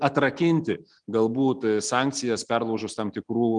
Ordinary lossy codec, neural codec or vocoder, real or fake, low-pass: Opus, 24 kbps; none; real; 9.9 kHz